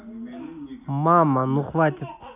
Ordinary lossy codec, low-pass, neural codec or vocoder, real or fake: none; 3.6 kHz; none; real